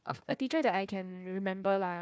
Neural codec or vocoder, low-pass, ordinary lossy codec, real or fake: codec, 16 kHz, 1 kbps, FunCodec, trained on Chinese and English, 50 frames a second; none; none; fake